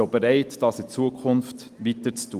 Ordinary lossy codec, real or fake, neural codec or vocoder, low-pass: Opus, 24 kbps; real; none; 14.4 kHz